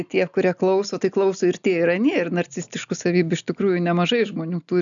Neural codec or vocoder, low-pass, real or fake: none; 7.2 kHz; real